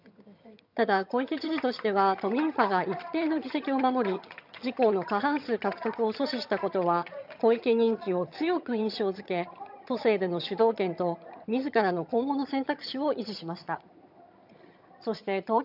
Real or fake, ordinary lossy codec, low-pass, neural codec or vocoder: fake; none; 5.4 kHz; vocoder, 22.05 kHz, 80 mel bands, HiFi-GAN